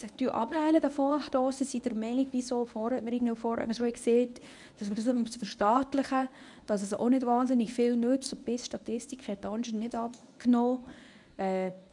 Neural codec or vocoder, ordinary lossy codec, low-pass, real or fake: codec, 24 kHz, 0.9 kbps, WavTokenizer, medium speech release version 1; none; 10.8 kHz; fake